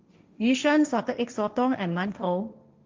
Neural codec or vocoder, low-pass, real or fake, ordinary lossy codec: codec, 16 kHz, 1.1 kbps, Voila-Tokenizer; 7.2 kHz; fake; Opus, 32 kbps